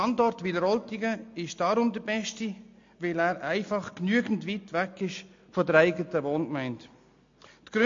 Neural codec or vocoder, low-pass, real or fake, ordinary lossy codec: none; 7.2 kHz; real; MP3, 48 kbps